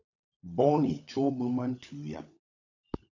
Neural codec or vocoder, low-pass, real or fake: codec, 16 kHz, 16 kbps, FunCodec, trained on LibriTTS, 50 frames a second; 7.2 kHz; fake